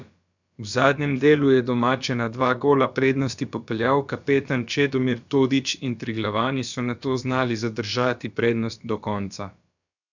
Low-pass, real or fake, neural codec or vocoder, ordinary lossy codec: 7.2 kHz; fake; codec, 16 kHz, about 1 kbps, DyCAST, with the encoder's durations; none